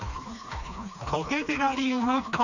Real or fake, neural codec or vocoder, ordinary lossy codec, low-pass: fake; codec, 16 kHz, 2 kbps, FreqCodec, smaller model; none; 7.2 kHz